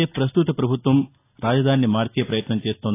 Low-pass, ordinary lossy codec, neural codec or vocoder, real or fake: 3.6 kHz; AAC, 24 kbps; none; real